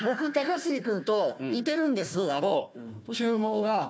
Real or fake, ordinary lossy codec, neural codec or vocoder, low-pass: fake; none; codec, 16 kHz, 1 kbps, FunCodec, trained on Chinese and English, 50 frames a second; none